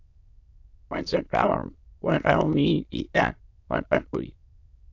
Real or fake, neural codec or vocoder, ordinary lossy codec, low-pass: fake; autoencoder, 22.05 kHz, a latent of 192 numbers a frame, VITS, trained on many speakers; AAC, 48 kbps; 7.2 kHz